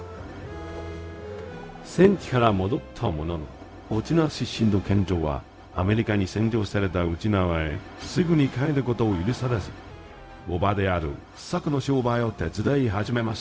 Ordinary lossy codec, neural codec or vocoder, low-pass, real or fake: none; codec, 16 kHz, 0.4 kbps, LongCat-Audio-Codec; none; fake